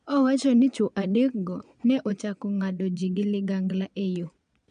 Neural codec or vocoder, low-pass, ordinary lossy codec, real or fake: vocoder, 22.05 kHz, 80 mel bands, Vocos; 9.9 kHz; MP3, 96 kbps; fake